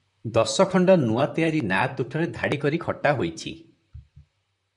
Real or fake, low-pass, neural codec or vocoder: fake; 10.8 kHz; vocoder, 44.1 kHz, 128 mel bands, Pupu-Vocoder